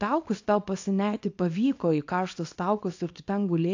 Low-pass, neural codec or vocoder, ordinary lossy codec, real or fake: 7.2 kHz; codec, 24 kHz, 0.9 kbps, WavTokenizer, small release; AAC, 48 kbps; fake